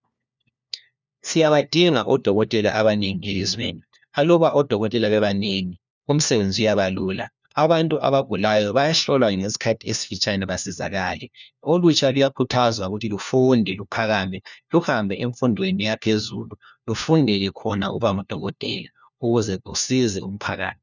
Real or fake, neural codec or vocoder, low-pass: fake; codec, 16 kHz, 1 kbps, FunCodec, trained on LibriTTS, 50 frames a second; 7.2 kHz